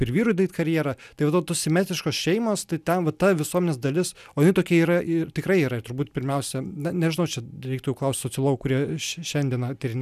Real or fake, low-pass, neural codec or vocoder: real; 14.4 kHz; none